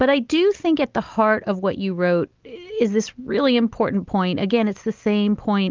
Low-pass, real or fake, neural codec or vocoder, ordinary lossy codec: 7.2 kHz; real; none; Opus, 24 kbps